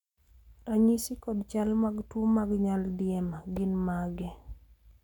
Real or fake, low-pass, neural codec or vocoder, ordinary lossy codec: real; 19.8 kHz; none; none